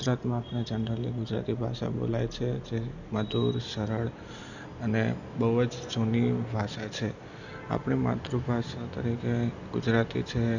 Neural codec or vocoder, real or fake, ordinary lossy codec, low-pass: none; real; none; 7.2 kHz